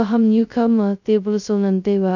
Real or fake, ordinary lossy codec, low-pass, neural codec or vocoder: fake; none; 7.2 kHz; codec, 16 kHz, 0.2 kbps, FocalCodec